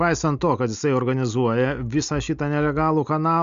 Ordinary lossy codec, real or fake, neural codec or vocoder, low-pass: Opus, 64 kbps; real; none; 7.2 kHz